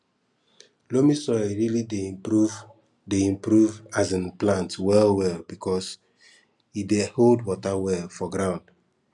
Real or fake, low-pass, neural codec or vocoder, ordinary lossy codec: real; 10.8 kHz; none; none